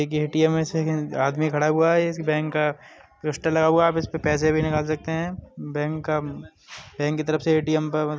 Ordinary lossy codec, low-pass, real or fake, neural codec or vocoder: none; none; real; none